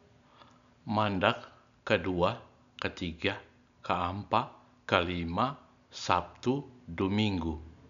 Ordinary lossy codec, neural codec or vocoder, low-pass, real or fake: none; none; 7.2 kHz; real